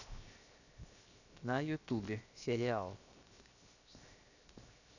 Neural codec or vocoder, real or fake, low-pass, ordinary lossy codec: codec, 16 kHz, 0.7 kbps, FocalCodec; fake; 7.2 kHz; none